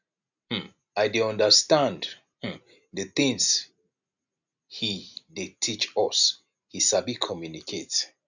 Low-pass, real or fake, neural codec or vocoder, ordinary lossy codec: 7.2 kHz; real; none; none